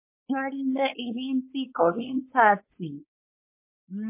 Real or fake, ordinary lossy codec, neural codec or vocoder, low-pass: fake; MP3, 24 kbps; codec, 16 kHz, 4.8 kbps, FACodec; 3.6 kHz